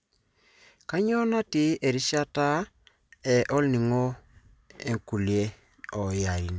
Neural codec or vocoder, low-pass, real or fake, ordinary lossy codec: none; none; real; none